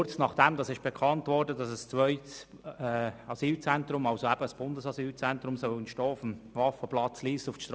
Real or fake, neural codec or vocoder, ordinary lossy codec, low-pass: real; none; none; none